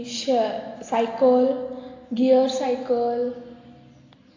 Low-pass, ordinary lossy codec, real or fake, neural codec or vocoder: 7.2 kHz; AAC, 32 kbps; real; none